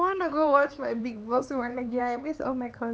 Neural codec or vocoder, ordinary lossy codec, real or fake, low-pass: codec, 16 kHz, 4 kbps, X-Codec, HuBERT features, trained on LibriSpeech; none; fake; none